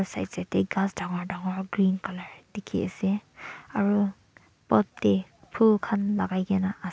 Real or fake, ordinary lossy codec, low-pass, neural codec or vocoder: real; none; none; none